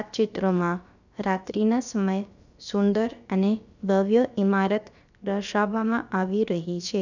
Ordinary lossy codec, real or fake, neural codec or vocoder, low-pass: none; fake; codec, 16 kHz, about 1 kbps, DyCAST, with the encoder's durations; 7.2 kHz